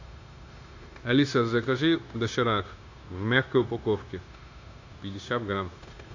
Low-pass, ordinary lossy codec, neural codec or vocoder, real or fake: 7.2 kHz; AAC, 48 kbps; codec, 16 kHz, 0.9 kbps, LongCat-Audio-Codec; fake